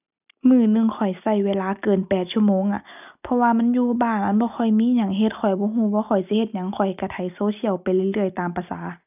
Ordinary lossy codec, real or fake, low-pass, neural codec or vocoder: none; real; 3.6 kHz; none